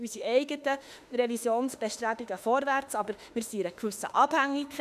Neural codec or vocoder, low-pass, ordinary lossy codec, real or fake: autoencoder, 48 kHz, 32 numbers a frame, DAC-VAE, trained on Japanese speech; 14.4 kHz; none; fake